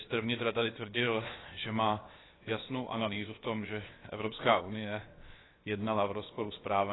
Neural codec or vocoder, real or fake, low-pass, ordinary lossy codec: codec, 16 kHz, 0.7 kbps, FocalCodec; fake; 7.2 kHz; AAC, 16 kbps